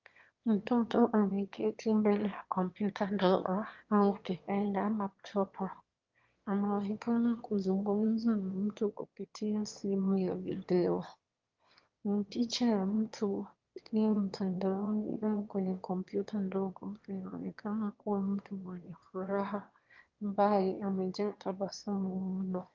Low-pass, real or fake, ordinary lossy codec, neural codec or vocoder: 7.2 kHz; fake; Opus, 32 kbps; autoencoder, 22.05 kHz, a latent of 192 numbers a frame, VITS, trained on one speaker